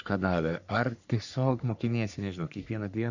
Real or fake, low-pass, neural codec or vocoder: fake; 7.2 kHz; codec, 44.1 kHz, 3.4 kbps, Pupu-Codec